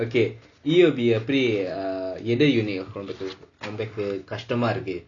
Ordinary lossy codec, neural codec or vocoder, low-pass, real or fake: Opus, 64 kbps; none; 7.2 kHz; real